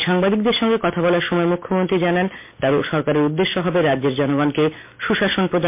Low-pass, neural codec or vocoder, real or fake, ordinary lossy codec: 3.6 kHz; none; real; MP3, 24 kbps